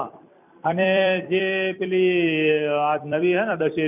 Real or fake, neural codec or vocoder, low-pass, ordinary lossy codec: fake; vocoder, 44.1 kHz, 128 mel bands every 256 samples, BigVGAN v2; 3.6 kHz; none